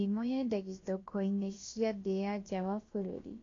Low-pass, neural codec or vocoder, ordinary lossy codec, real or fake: 7.2 kHz; codec, 16 kHz, about 1 kbps, DyCAST, with the encoder's durations; AAC, 32 kbps; fake